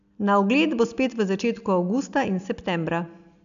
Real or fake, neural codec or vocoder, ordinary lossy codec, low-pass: real; none; none; 7.2 kHz